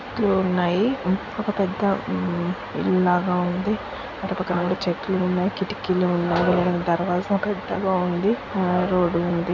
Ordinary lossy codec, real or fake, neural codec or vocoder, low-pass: none; real; none; 7.2 kHz